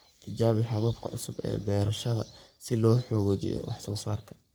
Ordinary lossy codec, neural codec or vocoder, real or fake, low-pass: none; codec, 44.1 kHz, 3.4 kbps, Pupu-Codec; fake; none